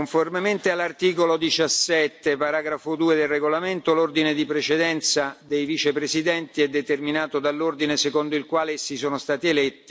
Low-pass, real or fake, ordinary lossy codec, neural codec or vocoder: none; real; none; none